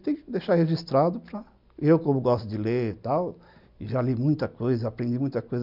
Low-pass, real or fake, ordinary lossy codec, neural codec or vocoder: 5.4 kHz; real; none; none